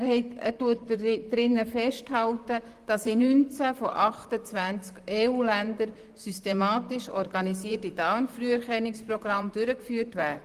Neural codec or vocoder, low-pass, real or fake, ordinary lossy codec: vocoder, 44.1 kHz, 128 mel bands, Pupu-Vocoder; 14.4 kHz; fake; Opus, 24 kbps